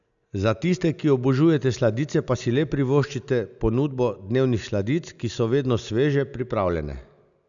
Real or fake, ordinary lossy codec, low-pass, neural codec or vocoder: real; none; 7.2 kHz; none